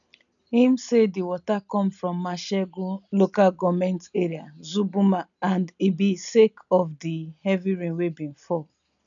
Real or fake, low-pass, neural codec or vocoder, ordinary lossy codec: real; 7.2 kHz; none; none